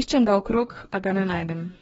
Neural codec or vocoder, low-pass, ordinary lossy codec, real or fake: codec, 44.1 kHz, 2.6 kbps, DAC; 19.8 kHz; AAC, 24 kbps; fake